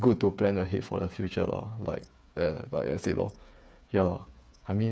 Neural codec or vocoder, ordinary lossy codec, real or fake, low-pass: codec, 16 kHz, 8 kbps, FreqCodec, smaller model; none; fake; none